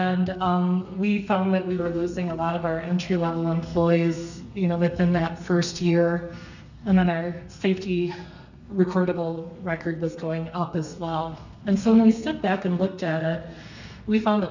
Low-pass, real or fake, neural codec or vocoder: 7.2 kHz; fake; codec, 32 kHz, 1.9 kbps, SNAC